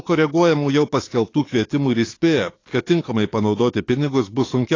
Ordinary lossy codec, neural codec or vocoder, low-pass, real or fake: AAC, 32 kbps; codec, 44.1 kHz, 7.8 kbps, DAC; 7.2 kHz; fake